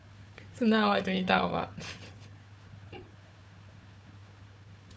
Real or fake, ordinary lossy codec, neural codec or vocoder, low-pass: fake; none; codec, 16 kHz, 4 kbps, FunCodec, trained on Chinese and English, 50 frames a second; none